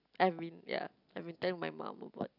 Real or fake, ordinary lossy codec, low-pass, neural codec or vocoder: real; none; 5.4 kHz; none